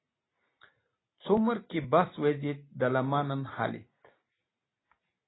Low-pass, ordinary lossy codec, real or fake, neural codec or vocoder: 7.2 kHz; AAC, 16 kbps; real; none